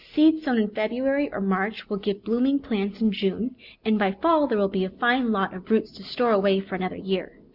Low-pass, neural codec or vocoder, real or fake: 5.4 kHz; none; real